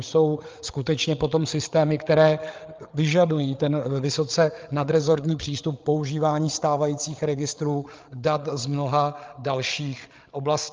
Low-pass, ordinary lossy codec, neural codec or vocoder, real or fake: 7.2 kHz; Opus, 24 kbps; codec, 16 kHz, 8 kbps, FreqCodec, larger model; fake